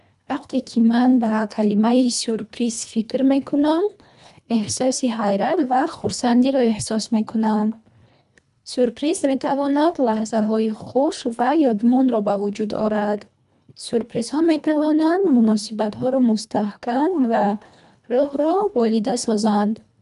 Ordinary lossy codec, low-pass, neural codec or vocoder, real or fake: none; 10.8 kHz; codec, 24 kHz, 1.5 kbps, HILCodec; fake